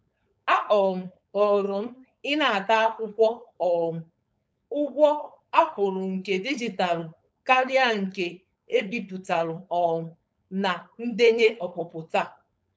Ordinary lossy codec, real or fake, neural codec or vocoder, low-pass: none; fake; codec, 16 kHz, 4.8 kbps, FACodec; none